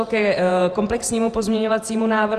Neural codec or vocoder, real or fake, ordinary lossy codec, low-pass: vocoder, 48 kHz, 128 mel bands, Vocos; fake; Opus, 24 kbps; 14.4 kHz